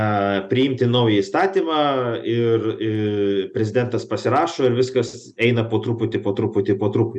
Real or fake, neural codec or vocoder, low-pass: real; none; 10.8 kHz